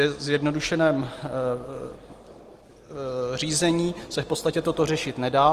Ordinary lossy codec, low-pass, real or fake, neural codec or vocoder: Opus, 24 kbps; 14.4 kHz; real; none